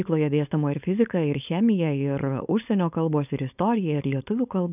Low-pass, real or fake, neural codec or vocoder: 3.6 kHz; fake; codec, 16 kHz, 4.8 kbps, FACodec